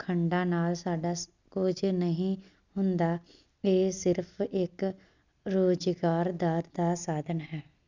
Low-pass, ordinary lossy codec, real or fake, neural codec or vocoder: 7.2 kHz; none; real; none